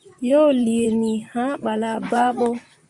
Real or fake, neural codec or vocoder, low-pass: fake; vocoder, 44.1 kHz, 128 mel bands, Pupu-Vocoder; 10.8 kHz